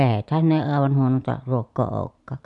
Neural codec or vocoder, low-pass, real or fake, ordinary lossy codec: vocoder, 44.1 kHz, 128 mel bands every 512 samples, BigVGAN v2; 10.8 kHz; fake; none